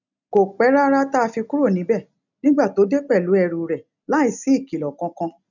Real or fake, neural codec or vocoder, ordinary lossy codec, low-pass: real; none; none; 7.2 kHz